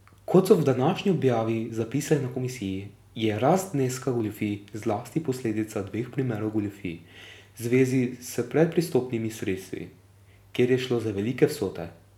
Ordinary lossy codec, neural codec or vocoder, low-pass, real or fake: none; none; 19.8 kHz; real